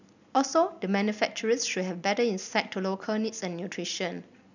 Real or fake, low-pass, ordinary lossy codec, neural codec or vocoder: real; 7.2 kHz; none; none